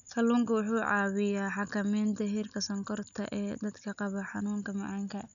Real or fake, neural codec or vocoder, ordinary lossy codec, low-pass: real; none; none; 7.2 kHz